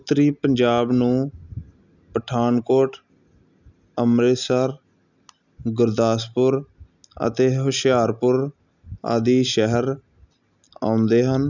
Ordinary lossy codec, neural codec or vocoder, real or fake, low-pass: none; none; real; 7.2 kHz